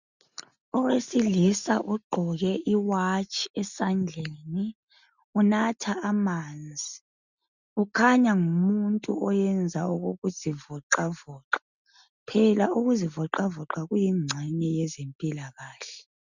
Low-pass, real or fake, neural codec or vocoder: 7.2 kHz; real; none